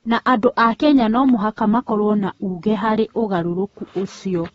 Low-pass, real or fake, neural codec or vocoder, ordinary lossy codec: 19.8 kHz; fake; codec, 44.1 kHz, 7.8 kbps, Pupu-Codec; AAC, 24 kbps